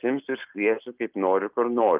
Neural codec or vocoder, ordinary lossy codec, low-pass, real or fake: none; Opus, 24 kbps; 3.6 kHz; real